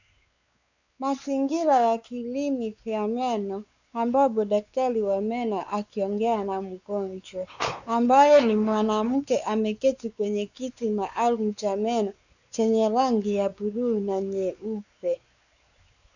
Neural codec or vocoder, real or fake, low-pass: codec, 16 kHz, 4 kbps, X-Codec, WavLM features, trained on Multilingual LibriSpeech; fake; 7.2 kHz